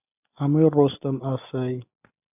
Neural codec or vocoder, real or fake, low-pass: none; real; 3.6 kHz